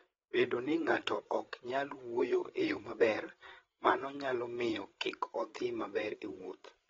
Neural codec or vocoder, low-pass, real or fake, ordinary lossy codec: codec, 16 kHz, 8 kbps, FreqCodec, larger model; 7.2 kHz; fake; AAC, 24 kbps